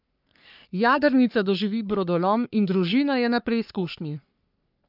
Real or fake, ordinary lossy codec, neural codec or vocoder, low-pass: fake; none; codec, 44.1 kHz, 3.4 kbps, Pupu-Codec; 5.4 kHz